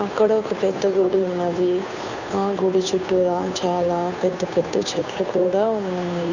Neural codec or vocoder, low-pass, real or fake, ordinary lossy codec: codec, 16 kHz, 2 kbps, FunCodec, trained on Chinese and English, 25 frames a second; 7.2 kHz; fake; none